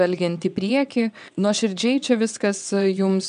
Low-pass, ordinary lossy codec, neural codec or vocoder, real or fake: 9.9 kHz; AAC, 96 kbps; vocoder, 22.05 kHz, 80 mel bands, WaveNeXt; fake